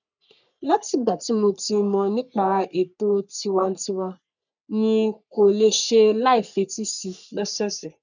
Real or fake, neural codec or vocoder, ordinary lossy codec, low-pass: fake; codec, 44.1 kHz, 3.4 kbps, Pupu-Codec; none; 7.2 kHz